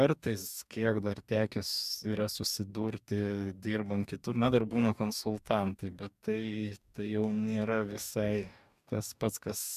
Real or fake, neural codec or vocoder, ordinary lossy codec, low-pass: fake; codec, 44.1 kHz, 2.6 kbps, DAC; MP3, 96 kbps; 14.4 kHz